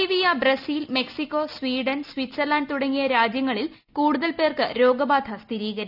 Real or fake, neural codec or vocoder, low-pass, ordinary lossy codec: real; none; 5.4 kHz; none